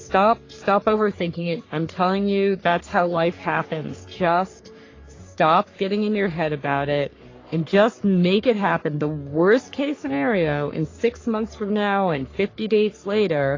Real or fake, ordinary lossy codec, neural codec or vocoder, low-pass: fake; AAC, 32 kbps; codec, 44.1 kHz, 3.4 kbps, Pupu-Codec; 7.2 kHz